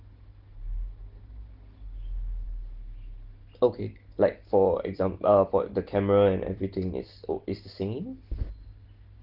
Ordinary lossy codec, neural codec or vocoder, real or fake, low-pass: Opus, 16 kbps; none; real; 5.4 kHz